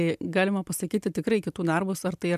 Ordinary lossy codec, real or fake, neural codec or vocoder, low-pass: MP3, 96 kbps; real; none; 14.4 kHz